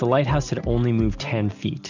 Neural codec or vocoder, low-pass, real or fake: none; 7.2 kHz; real